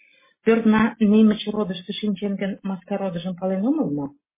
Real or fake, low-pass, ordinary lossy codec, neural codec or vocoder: real; 3.6 kHz; MP3, 16 kbps; none